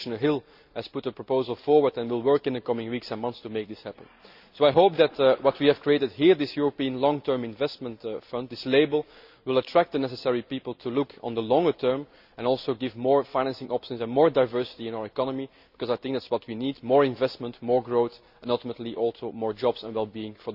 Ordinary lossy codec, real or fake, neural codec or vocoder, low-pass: Opus, 64 kbps; real; none; 5.4 kHz